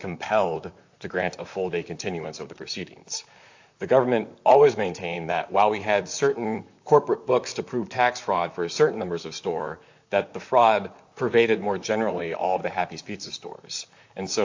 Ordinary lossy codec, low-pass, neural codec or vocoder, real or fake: AAC, 48 kbps; 7.2 kHz; vocoder, 44.1 kHz, 128 mel bands, Pupu-Vocoder; fake